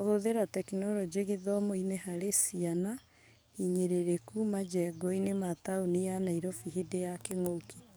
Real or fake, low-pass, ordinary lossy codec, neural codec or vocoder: fake; none; none; codec, 44.1 kHz, 7.8 kbps, DAC